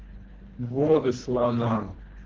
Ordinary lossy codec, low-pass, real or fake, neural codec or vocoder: Opus, 16 kbps; 7.2 kHz; fake; codec, 24 kHz, 1.5 kbps, HILCodec